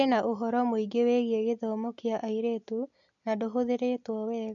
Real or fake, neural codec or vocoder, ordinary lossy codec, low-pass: real; none; none; 7.2 kHz